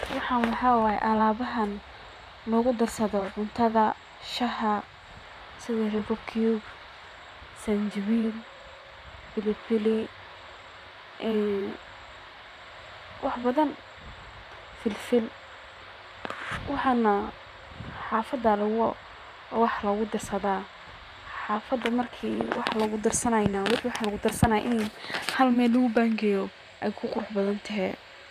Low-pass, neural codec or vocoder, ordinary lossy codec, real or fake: 14.4 kHz; vocoder, 44.1 kHz, 128 mel bands, Pupu-Vocoder; none; fake